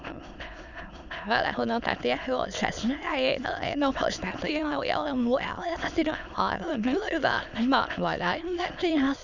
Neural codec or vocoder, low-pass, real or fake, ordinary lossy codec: autoencoder, 22.05 kHz, a latent of 192 numbers a frame, VITS, trained on many speakers; 7.2 kHz; fake; none